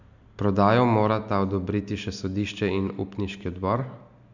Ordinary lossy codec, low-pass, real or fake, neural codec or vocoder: none; 7.2 kHz; real; none